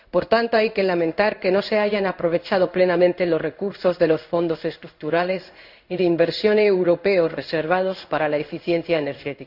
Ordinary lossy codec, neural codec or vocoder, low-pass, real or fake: none; codec, 16 kHz in and 24 kHz out, 1 kbps, XY-Tokenizer; 5.4 kHz; fake